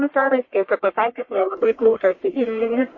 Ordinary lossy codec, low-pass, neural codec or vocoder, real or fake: MP3, 32 kbps; 7.2 kHz; codec, 44.1 kHz, 1.7 kbps, Pupu-Codec; fake